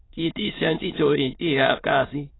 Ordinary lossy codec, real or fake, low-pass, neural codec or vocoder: AAC, 16 kbps; fake; 7.2 kHz; autoencoder, 22.05 kHz, a latent of 192 numbers a frame, VITS, trained on many speakers